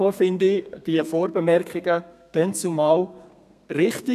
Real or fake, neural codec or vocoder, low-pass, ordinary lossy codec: fake; codec, 32 kHz, 1.9 kbps, SNAC; 14.4 kHz; none